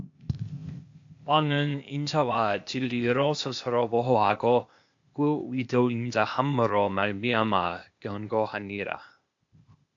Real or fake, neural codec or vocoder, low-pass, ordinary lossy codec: fake; codec, 16 kHz, 0.8 kbps, ZipCodec; 7.2 kHz; AAC, 64 kbps